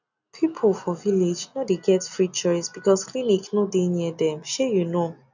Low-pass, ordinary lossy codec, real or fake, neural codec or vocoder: 7.2 kHz; none; real; none